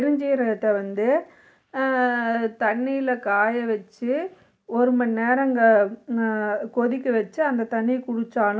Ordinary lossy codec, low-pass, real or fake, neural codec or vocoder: none; none; real; none